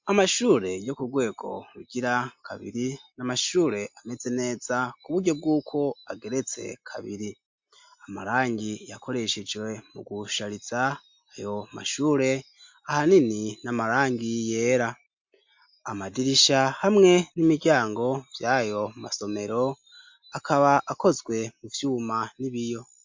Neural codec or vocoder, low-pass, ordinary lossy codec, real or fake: none; 7.2 kHz; MP3, 48 kbps; real